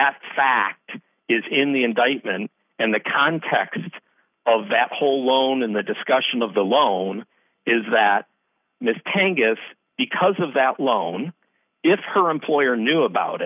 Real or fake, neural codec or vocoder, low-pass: real; none; 3.6 kHz